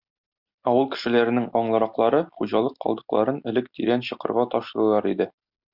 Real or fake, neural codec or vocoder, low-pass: real; none; 5.4 kHz